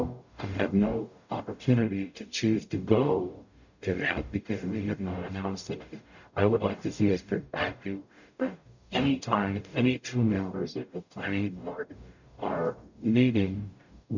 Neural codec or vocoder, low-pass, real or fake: codec, 44.1 kHz, 0.9 kbps, DAC; 7.2 kHz; fake